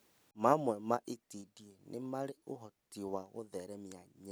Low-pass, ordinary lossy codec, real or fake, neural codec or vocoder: none; none; real; none